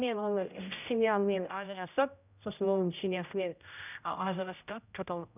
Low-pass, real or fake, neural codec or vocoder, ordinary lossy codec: 3.6 kHz; fake; codec, 16 kHz, 0.5 kbps, X-Codec, HuBERT features, trained on general audio; none